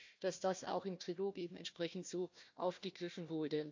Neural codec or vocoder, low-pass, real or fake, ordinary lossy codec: codec, 16 kHz, 1 kbps, FunCodec, trained on Chinese and English, 50 frames a second; 7.2 kHz; fake; MP3, 48 kbps